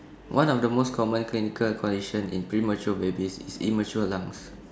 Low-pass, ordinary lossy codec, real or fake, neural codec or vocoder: none; none; real; none